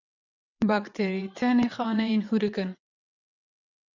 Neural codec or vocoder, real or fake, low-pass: vocoder, 22.05 kHz, 80 mel bands, WaveNeXt; fake; 7.2 kHz